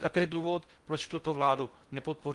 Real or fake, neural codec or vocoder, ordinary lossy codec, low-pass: fake; codec, 16 kHz in and 24 kHz out, 0.6 kbps, FocalCodec, streaming, 4096 codes; Opus, 24 kbps; 10.8 kHz